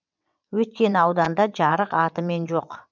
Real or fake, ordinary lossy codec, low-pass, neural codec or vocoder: fake; none; 7.2 kHz; vocoder, 22.05 kHz, 80 mel bands, WaveNeXt